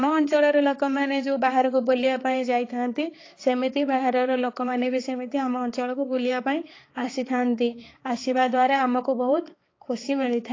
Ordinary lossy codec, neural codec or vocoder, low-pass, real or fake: AAC, 32 kbps; codec, 16 kHz, 4 kbps, X-Codec, HuBERT features, trained on balanced general audio; 7.2 kHz; fake